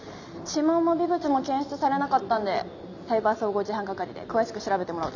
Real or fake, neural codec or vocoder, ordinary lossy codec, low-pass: real; none; none; 7.2 kHz